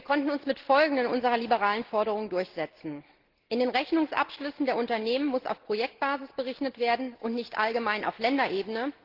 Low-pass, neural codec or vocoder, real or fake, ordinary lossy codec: 5.4 kHz; none; real; Opus, 16 kbps